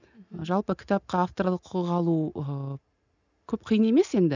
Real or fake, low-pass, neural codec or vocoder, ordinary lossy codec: real; 7.2 kHz; none; none